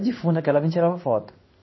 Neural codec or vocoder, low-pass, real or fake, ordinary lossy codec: codec, 16 kHz in and 24 kHz out, 1 kbps, XY-Tokenizer; 7.2 kHz; fake; MP3, 24 kbps